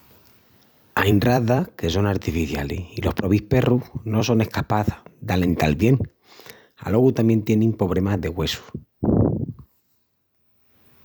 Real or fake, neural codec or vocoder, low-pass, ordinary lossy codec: fake; vocoder, 44.1 kHz, 128 mel bands every 256 samples, BigVGAN v2; none; none